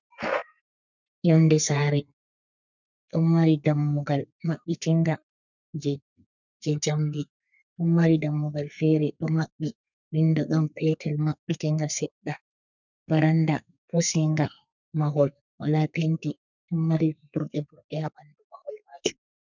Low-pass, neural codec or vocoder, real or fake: 7.2 kHz; codec, 32 kHz, 1.9 kbps, SNAC; fake